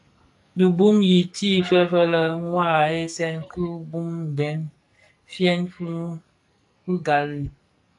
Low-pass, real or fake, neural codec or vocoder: 10.8 kHz; fake; codec, 44.1 kHz, 2.6 kbps, SNAC